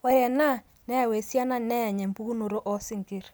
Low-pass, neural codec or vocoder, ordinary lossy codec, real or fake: none; none; none; real